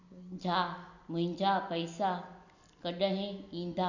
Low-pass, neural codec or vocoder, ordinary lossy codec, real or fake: 7.2 kHz; none; none; real